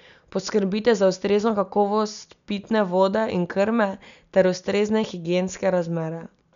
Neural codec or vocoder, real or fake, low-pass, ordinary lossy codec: none; real; 7.2 kHz; none